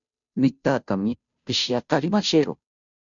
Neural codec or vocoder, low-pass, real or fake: codec, 16 kHz, 0.5 kbps, FunCodec, trained on Chinese and English, 25 frames a second; 7.2 kHz; fake